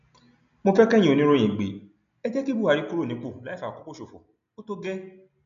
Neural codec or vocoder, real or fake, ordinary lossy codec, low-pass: none; real; none; 7.2 kHz